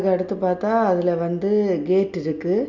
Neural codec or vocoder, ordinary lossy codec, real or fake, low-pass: none; none; real; 7.2 kHz